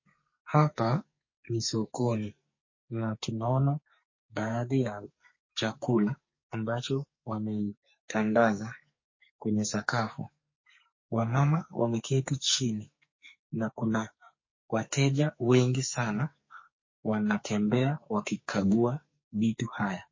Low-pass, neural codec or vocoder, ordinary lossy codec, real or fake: 7.2 kHz; codec, 44.1 kHz, 2.6 kbps, SNAC; MP3, 32 kbps; fake